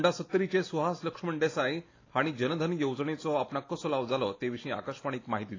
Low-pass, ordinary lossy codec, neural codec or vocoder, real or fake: 7.2 kHz; AAC, 32 kbps; none; real